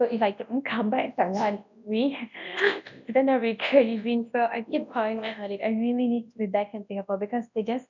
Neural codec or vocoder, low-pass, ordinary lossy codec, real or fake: codec, 24 kHz, 0.9 kbps, WavTokenizer, large speech release; 7.2 kHz; none; fake